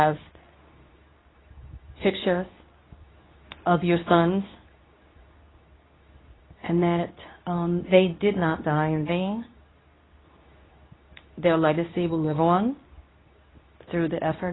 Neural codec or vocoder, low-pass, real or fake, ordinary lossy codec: codec, 24 kHz, 0.9 kbps, WavTokenizer, medium speech release version 2; 7.2 kHz; fake; AAC, 16 kbps